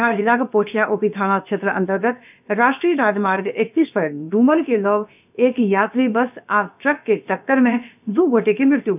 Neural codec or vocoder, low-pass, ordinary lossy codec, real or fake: codec, 16 kHz, about 1 kbps, DyCAST, with the encoder's durations; 3.6 kHz; none; fake